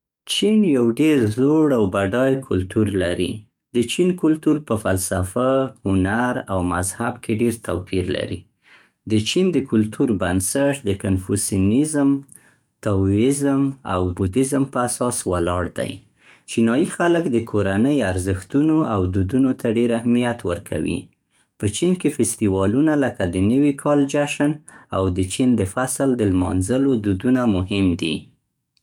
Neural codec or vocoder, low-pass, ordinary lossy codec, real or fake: codec, 44.1 kHz, 7.8 kbps, DAC; 19.8 kHz; none; fake